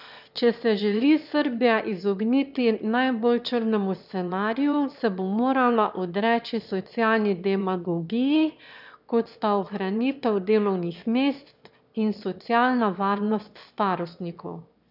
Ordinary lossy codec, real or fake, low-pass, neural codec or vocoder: none; fake; 5.4 kHz; autoencoder, 22.05 kHz, a latent of 192 numbers a frame, VITS, trained on one speaker